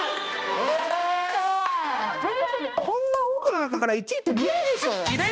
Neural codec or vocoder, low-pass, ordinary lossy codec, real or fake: codec, 16 kHz, 1 kbps, X-Codec, HuBERT features, trained on balanced general audio; none; none; fake